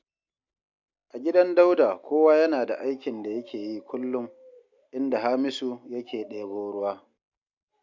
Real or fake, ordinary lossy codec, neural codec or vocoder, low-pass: real; MP3, 64 kbps; none; 7.2 kHz